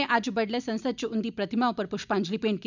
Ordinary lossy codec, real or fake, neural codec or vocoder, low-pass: none; real; none; 7.2 kHz